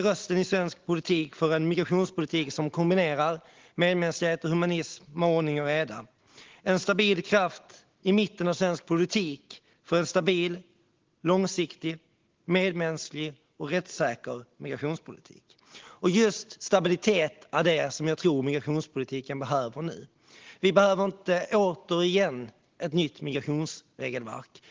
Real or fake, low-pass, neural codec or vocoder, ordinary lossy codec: real; 7.2 kHz; none; Opus, 16 kbps